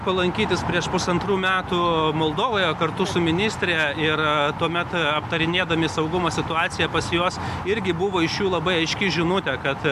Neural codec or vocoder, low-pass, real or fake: none; 14.4 kHz; real